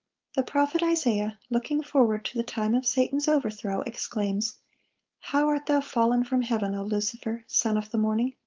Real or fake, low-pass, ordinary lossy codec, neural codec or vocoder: fake; 7.2 kHz; Opus, 32 kbps; codec, 16 kHz, 4.8 kbps, FACodec